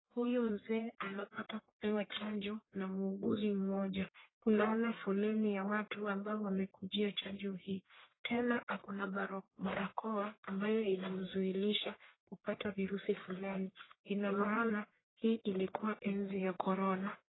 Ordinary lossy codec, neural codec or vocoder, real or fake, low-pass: AAC, 16 kbps; codec, 44.1 kHz, 1.7 kbps, Pupu-Codec; fake; 7.2 kHz